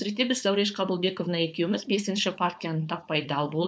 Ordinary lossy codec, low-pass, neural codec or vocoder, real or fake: none; none; codec, 16 kHz, 4.8 kbps, FACodec; fake